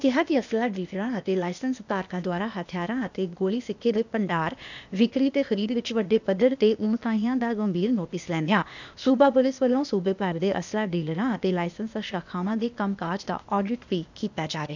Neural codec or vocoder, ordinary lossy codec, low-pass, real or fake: codec, 16 kHz, 0.8 kbps, ZipCodec; none; 7.2 kHz; fake